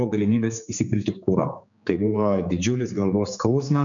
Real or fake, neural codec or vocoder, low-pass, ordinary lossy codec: fake; codec, 16 kHz, 4 kbps, X-Codec, HuBERT features, trained on general audio; 7.2 kHz; MP3, 64 kbps